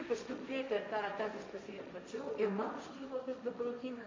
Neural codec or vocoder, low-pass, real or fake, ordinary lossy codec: codec, 16 kHz, 1.1 kbps, Voila-Tokenizer; 7.2 kHz; fake; MP3, 48 kbps